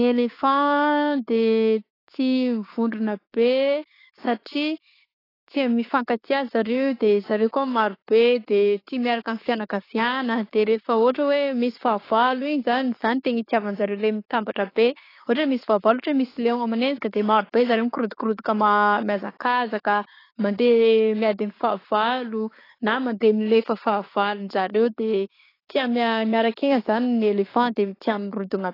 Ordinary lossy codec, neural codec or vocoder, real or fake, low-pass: AAC, 24 kbps; codec, 16 kHz, 6 kbps, DAC; fake; 5.4 kHz